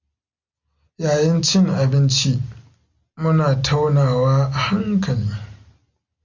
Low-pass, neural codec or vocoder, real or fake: 7.2 kHz; none; real